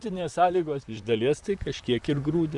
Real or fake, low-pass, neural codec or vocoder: fake; 10.8 kHz; vocoder, 44.1 kHz, 128 mel bands, Pupu-Vocoder